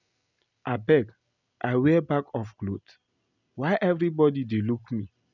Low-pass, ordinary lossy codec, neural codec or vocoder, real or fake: 7.2 kHz; none; none; real